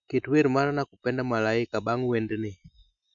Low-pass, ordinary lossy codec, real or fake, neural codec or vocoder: 7.2 kHz; none; real; none